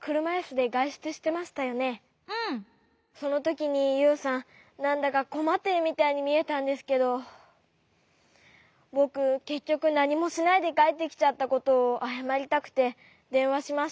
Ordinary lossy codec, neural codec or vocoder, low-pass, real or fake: none; none; none; real